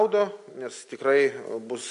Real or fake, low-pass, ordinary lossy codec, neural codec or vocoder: real; 10.8 kHz; MP3, 48 kbps; none